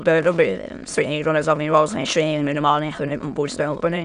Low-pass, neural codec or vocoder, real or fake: 9.9 kHz; autoencoder, 22.05 kHz, a latent of 192 numbers a frame, VITS, trained on many speakers; fake